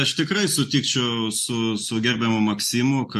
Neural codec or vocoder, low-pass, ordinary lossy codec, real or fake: none; 14.4 kHz; MP3, 64 kbps; real